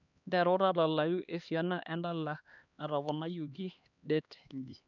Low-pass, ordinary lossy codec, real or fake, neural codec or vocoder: 7.2 kHz; none; fake; codec, 16 kHz, 2 kbps, X-Codec, HuBERT features, trained on LibriSpeech